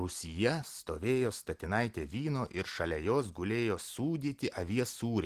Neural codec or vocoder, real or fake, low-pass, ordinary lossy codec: none; real; 14.4 kHz; Opus, 16 kbps